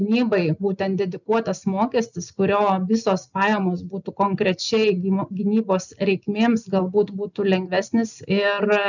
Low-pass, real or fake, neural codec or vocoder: 7.2 kHz; real; none